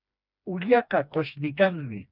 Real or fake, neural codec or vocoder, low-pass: fake; codec, 16 kHz, 2 kbps, FreqCodec, smaller model; 5.4 kHz